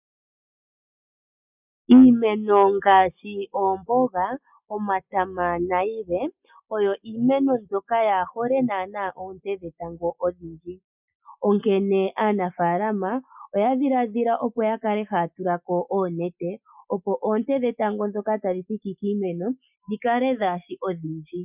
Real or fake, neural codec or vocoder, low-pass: real; none; 3.6 kHz